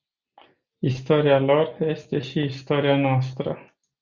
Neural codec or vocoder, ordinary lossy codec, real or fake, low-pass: none; Opus, 64 kbps; real; 7.2 kHz